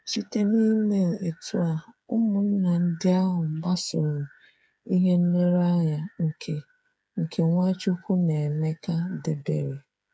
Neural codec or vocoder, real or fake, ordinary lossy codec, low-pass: codec, 16 kHz, 8 kbps, FreqCodec, smaller model; fake; none; none